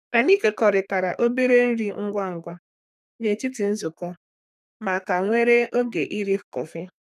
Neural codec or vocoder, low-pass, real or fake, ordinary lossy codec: codec, 32 kHz, 1.9 kbps, SNAC; 14.4 kHz; fake; none